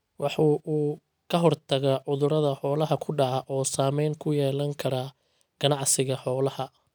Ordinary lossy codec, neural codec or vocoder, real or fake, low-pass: none; none; real; none